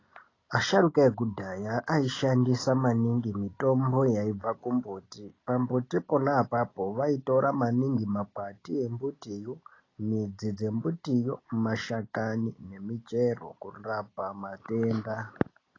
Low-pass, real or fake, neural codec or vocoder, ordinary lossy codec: 7.2 kHz; real; none; AAC, 32 kbps